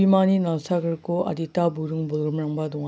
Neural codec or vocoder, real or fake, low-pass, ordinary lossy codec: none; real; none; none